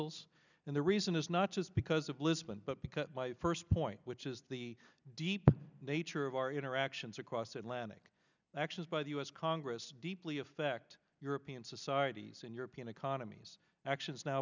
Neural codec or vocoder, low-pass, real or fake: none; 7.2 kHz; real